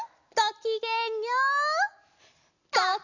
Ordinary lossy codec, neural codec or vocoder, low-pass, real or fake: none; none; 7.2 kHz; real